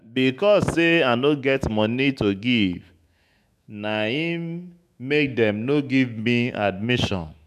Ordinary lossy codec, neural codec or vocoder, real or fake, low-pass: none; autoencoder, 48 kHz, 128 numbers a frame, DAC-VAE, trained on Japanese speech; fake; 14.4 kHz